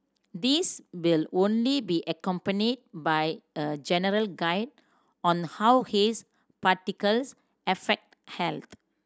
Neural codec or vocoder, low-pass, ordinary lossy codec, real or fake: none; none; none; real